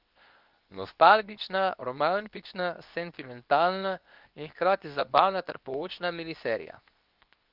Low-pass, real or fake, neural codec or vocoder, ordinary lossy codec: 5.4 kHz; fake; codec, 24 kHz, 0.9 kbps, WavTokenizer, medium speech release version 2; Opus, 24 kbps